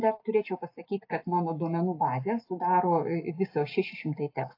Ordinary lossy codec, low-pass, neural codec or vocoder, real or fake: AAC, 32 kbps; 5.4 kHz; none; real